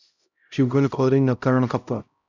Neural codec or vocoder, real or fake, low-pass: codec, 16 kHz, 0.5 kbps, X-Codec, HuBERT features, trained on LibriSpeech; fake; 7.2 kHz